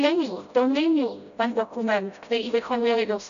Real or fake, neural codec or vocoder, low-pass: fake; codec, 16 kHz, 0.5 kbps, FreqCodec, smaller model; 7.2 kHz